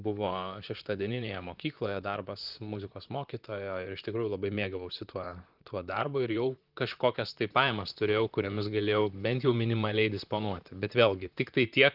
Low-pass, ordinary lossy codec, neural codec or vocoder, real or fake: 5.4 kHz; Opus, 32 kbps; vocoder, 44.1 kHz, 128 mel bands, Pupu-Vocoder; fake